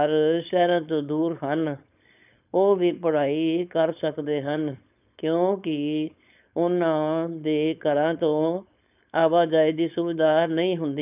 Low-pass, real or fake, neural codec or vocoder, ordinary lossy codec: 3.6 kHz; fake; codec, 16 kHz, 4.8 kbps, FACodec; none